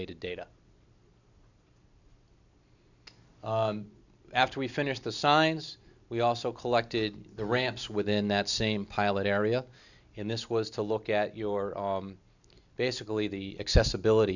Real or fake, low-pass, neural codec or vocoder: real; 7.2 kHz; none